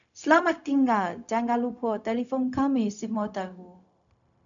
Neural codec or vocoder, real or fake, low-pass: codec, 16 kHz, 0.4 kbps, LongCat-Audio-Codec; fake; 7.2 kHz